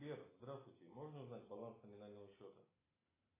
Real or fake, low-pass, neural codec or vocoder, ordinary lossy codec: real; 3.6 kHz; none; AAC, 16 kbps